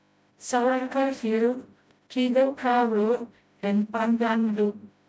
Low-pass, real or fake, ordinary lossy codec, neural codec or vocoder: none; fake; none; codec, 16 kHz, 0.5 kbps, FreqCodec, smaller model